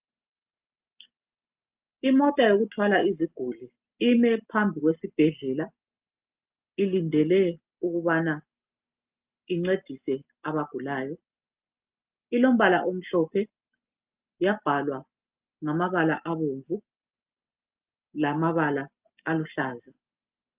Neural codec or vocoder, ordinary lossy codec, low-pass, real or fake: none; Opus, 24 kbps; 3.6 kHz; real